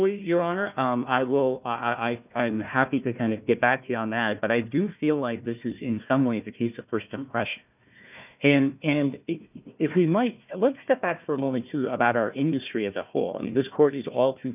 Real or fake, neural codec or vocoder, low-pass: fake; codec, 16 kHz, 1 kbps, FunCodec, trained on Chinese and English, 50 frames a second; 3.6 kHz